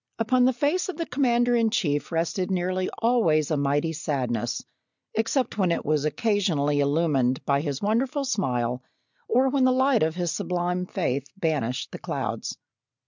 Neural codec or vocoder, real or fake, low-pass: none; real; 7.2 kHz